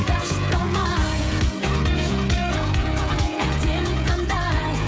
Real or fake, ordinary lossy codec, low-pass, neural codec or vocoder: fake; none; none; codec, 16 kHz, 16 kbps, FreqCodec, smaller model